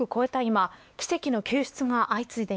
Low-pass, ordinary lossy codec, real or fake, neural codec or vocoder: none; none; fake; codec, 16 kHz, 2 kbps, X-Codec, WavLM features, trained on Multilingual LibriSpeech